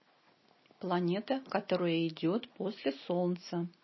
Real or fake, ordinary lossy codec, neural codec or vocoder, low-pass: real; MP3, 24 kbps; none; 7.2 kHz